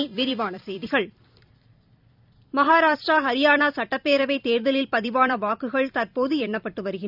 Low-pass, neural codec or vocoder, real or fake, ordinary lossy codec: 5.4 kHz; vocoder, 44.1 kHz, 128 mel bands every 256 samples, BigVGAN v2; fake; none